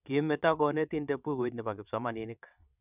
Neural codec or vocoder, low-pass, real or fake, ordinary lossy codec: vocoder, 22.05 kHz, 80 mel bands, WaveNeXt; 3.6 kHz; fake; none